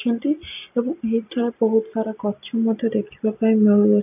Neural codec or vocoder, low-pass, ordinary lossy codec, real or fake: none; 3.6 kHz; AAC, 32 kbps; real